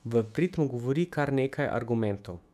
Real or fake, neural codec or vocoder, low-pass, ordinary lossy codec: fake; autoencoder, 48 kHz, 128 numbers a frame, DAC-VAE, trained on Japanese speech; 14.4 kHz; none